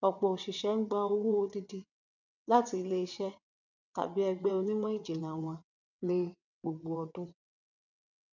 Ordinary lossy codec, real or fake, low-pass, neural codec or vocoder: none; fake; 7.2 kHz; vocoder, 22.05 kHz, 80 mel bands, WaveNeXt